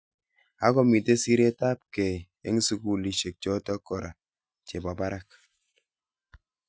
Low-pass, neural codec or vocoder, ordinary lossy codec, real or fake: none; none; none; real